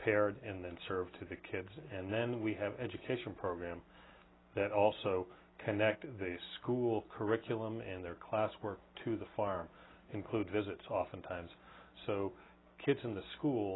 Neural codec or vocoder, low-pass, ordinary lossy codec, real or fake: none; 7.2 kHz; AAC, 16 kbps; real